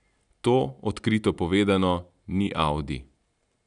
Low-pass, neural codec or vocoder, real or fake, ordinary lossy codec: 9.9 kHz; none; real; none